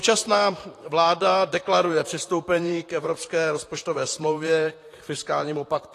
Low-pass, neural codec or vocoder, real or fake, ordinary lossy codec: 14.4 kHz; vocoder, 44.1 kHz, 128 mel bands, Pupu-Vocoder; fake; AAC, 48 kbps